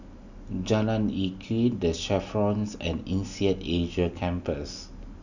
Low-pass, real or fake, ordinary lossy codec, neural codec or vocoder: 7.2 kHz; real; none; none